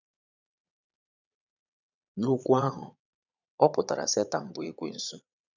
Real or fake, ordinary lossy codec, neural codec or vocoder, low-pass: fake; none; vocoder, 22.05 kHz, 80 mel bands, Vocos; 7.2 kHz